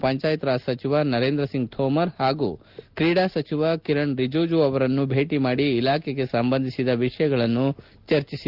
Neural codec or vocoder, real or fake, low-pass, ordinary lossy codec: none; real; 5.4 kHz; Opus, 24 kbps